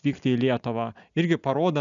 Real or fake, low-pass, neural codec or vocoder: real; 7.2 kHz; none